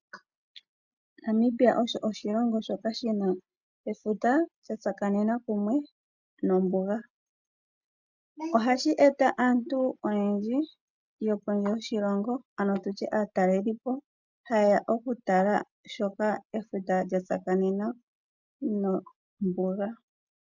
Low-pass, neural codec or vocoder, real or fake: 7.2 kHz; none; real